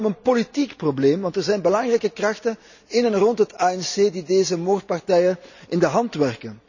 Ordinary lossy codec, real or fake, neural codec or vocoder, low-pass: none; real; none; 7.2 kHz